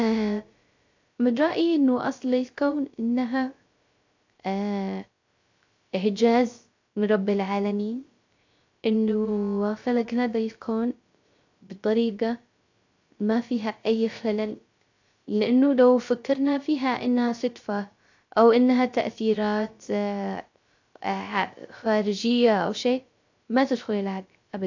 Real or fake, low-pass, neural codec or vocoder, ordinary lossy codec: fake; 7.2 kHz; codec, 16 kHz, 0.3 kbps, FocalCodec; AAC, 48 kbps